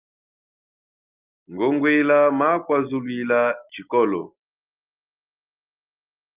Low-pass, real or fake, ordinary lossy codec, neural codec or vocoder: 3.6 kHz; real; Opus, 24 kbps; none